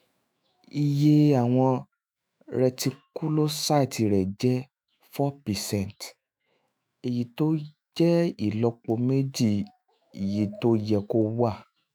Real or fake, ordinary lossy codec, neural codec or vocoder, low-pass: fake; none; autoencoder, 48 kHz, 128 numbers a frame, DAC-VAE, trained on Japanese speech; none